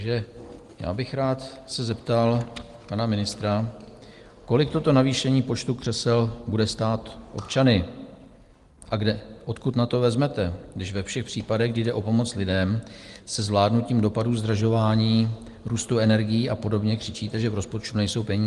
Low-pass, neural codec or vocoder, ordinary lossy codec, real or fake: 10.8 kHz; none; Opus, 24 kbps; real